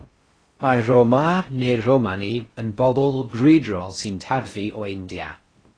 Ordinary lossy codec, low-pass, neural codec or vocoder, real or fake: AAC, 32 kbps; 9.9 kHz; codec, 16 kHz in and 24 kHz out, 0.6 kbps, FocalCodec, streaming, 2048 codes; fake